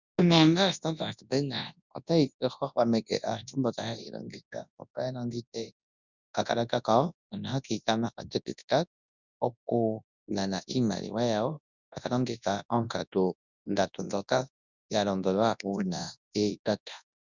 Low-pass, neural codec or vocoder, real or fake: 7.2 kHz; codec, 24 kHz, 0.9 kbps, WavTokenizer, large speech release; fake